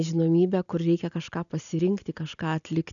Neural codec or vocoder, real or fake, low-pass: none; real; 7.2 kHz